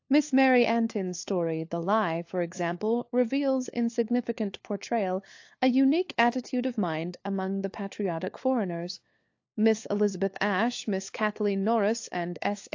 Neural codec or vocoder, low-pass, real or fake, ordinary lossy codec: codec, 16 kHz, 4 kbps, FunCodec, trained on LibriTTS, 50 frames a second; 7.2 kHz; fake; AAC, 48 kbps